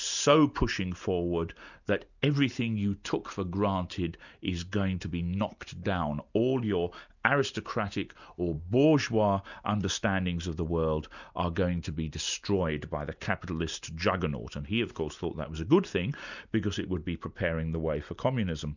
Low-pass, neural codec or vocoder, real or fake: 7.2 kHz; none; real